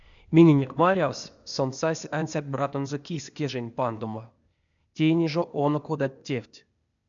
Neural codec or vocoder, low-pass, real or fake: codec, 16 kHz, 0.8 kbps, ZipCodec; 7.2 kHz; fake